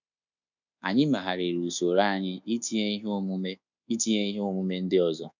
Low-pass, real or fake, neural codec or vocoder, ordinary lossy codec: 7.2 kHz; fake; codec, 24 kHz, 1.2 kbps, DualCodec; none